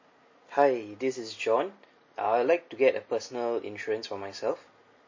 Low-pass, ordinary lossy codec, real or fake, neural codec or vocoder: 7.2 kHz; MP3, 32 kbps; real; none